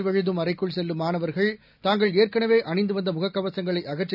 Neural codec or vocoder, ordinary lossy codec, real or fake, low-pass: none; none; real; 5.4 kHz